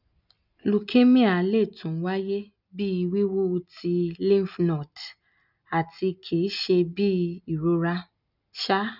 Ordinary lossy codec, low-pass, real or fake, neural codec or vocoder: none; 5.4 kHz; real; none